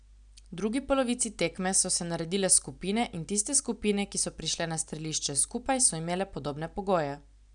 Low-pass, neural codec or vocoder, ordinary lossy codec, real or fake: 9.9 kHz; none; none; real